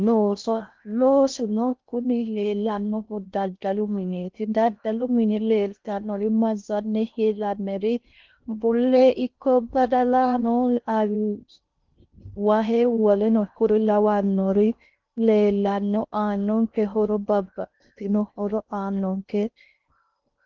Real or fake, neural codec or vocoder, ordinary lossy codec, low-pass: fake; codec, 16 kHz in and 24 kHz out, 0.6 kbps, FocalCodec, streaming, 4096 codes; Opus, 32 kbps; 7.2 kHz